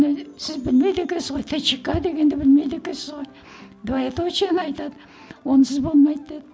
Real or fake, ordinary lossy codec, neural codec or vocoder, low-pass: real; none; none; none